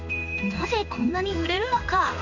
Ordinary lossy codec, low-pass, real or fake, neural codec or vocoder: none; 7.2 kHz; fake; codec, 16 kHz in and 24 kHz out, 1 kbps, XY-Tokenizer